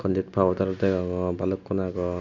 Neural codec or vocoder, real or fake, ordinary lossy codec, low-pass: none; real; none; 7.2 kHz